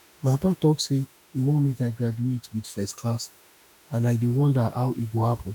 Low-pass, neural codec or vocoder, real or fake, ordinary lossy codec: 19.8 kHz; autoencoder, 48 kHz, 32 numbers a frame, DAC-VAE, trained on Japanese speech; fake; none